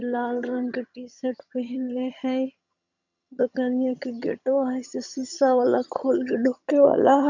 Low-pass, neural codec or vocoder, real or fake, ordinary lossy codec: 7.2 kHz; vocoder, 22.05 kHz, 80 mel bands, HiFi-GAN; fake; none